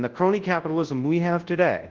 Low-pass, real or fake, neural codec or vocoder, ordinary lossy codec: 7.2 kHz; fake; codec, 24 kHz, 0.9 kbps, WavTokenizer, large speech release; Opus, 16 kbps